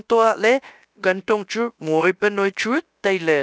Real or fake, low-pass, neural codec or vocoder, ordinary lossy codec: fake; none; codec, 16 kHz, about 1 kbps, DyCAST, with the encoder's durations; none